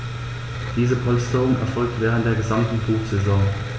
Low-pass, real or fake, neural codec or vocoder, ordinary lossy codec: none; real; none; none